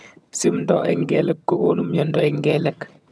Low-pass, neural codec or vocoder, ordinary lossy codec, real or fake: none; vocoder, 22.05 kHz, 80 mel bands, HiFi-GAN; none; fake